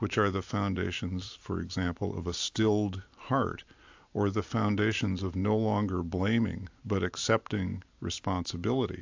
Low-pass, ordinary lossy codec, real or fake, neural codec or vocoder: 7.2 kHz; AAC, 48 kbps; fake; vocoder, 44.1 kHz, 128 mel bands every 512 samples, BigVGAN v2